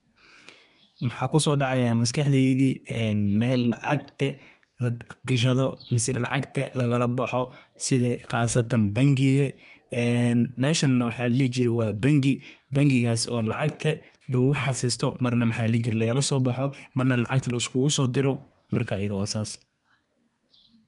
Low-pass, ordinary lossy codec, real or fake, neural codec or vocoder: 10.8 kHz; none; fake; codec, 24 kHz, 1 kbps, SNAC